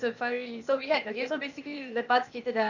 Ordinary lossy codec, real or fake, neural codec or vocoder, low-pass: none; fake; codec, 16 kHz, 0.8 kbps, ZipCodec; 7.2 kHz